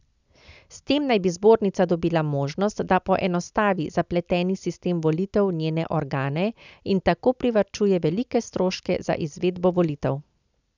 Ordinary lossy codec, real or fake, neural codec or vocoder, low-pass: none; real; none; 7.2 kHz